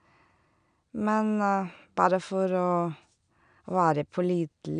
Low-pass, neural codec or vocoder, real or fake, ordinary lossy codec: 9.9 kHz; none; real; none